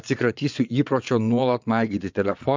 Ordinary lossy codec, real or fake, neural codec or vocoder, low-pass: MP3, 64 kbps; fake; vocoder, 22.05 kHz, 80 mel bands, Vocos; 7.2 kHz